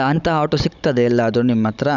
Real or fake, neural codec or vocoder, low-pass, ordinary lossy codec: fake; codec, 16 kHz, 16 kbps, FunCodec, trained on Chinese and English, 50 frames a second; 7.2 kHz; none